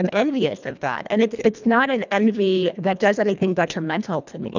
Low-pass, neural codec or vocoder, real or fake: 7.2 kHz; codec, 24 kHz, 1.5 kbps, HILCodec; fake